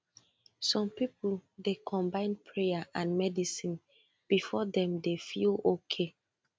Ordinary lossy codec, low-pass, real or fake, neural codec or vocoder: none; none; real; none